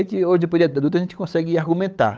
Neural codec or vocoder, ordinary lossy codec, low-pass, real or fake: codec, 16 kHz, 8 kbps, FunCodec, trained on Chinese and English, 25 frames a second; none; none; fake